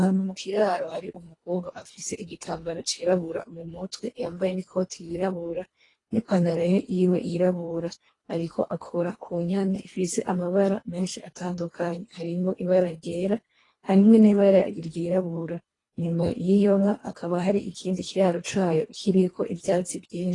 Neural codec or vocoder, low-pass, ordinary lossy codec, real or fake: codec, 24 kHz, 1.5 kbps, HILCodec; 10.8 kHz; AAC, 32 kbps; fake